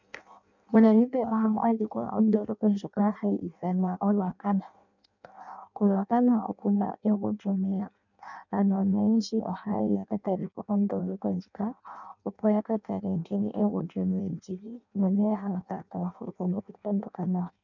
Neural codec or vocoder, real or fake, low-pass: codec, 16 kHz in and 24 kHz out, 0.6 kbps, FireRedTTS-2 codec; fake; 7.2 kHz